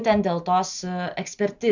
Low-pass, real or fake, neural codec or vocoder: 7.2 kHz; real; none